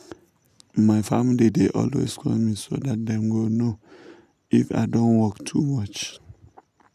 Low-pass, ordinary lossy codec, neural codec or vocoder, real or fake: 14.4 kHz; none; none; real